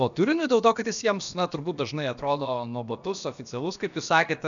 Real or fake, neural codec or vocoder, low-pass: fake; codec, 16 kHz, about 1 kbps, DyCAST, with the encoder's durations; 7.2 kHz